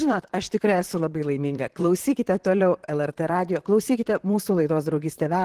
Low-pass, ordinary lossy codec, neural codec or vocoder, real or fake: 14.4 kHz; Opus, 16 kbps; vocoder, 44.1 kHz, 128 mel bands, Pupu-Vocoder; fake